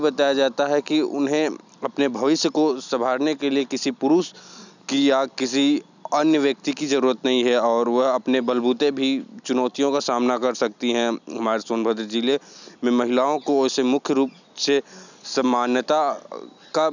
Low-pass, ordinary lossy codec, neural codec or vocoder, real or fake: 7.2 kHz; none; none; real